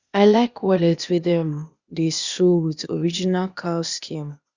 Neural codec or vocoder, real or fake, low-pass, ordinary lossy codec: codec, 16 kHz, 0.8 kbps, ZipCodec; fake; 7.2 kHz; Opus, 64 kbps